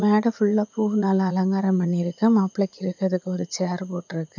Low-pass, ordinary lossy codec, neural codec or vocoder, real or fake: 7.2 kHz; none; vocoder, 44.1 kHz, 80 mel bands, Vocos; fake